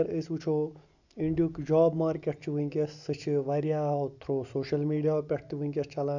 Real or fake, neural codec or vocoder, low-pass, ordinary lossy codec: real; none; 7.2 kHz; none